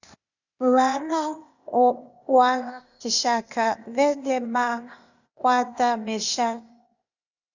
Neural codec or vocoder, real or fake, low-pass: codec, 16 kHz, 0.8 kbps, ZipCodec; fake; 7.2 kHz